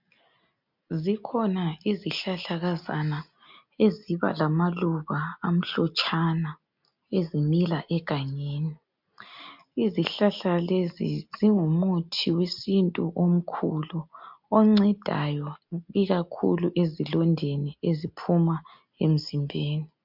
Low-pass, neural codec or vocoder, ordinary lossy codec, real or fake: 5.4 kHz; none; MP3, 48 kbps; real